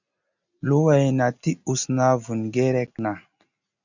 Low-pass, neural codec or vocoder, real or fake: 7.2 kHz; none; real